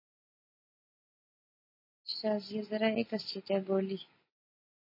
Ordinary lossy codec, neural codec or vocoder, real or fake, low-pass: MP3, 24 kbps; none; real; 5.4 kHz